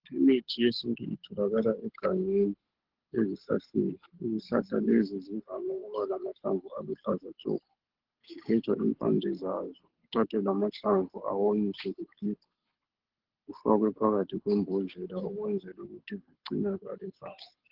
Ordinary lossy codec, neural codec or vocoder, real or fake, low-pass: Opus, 16 kbps; codec, 24 kHz, 6 kbps, HILCodec; fake; 5.4 kHz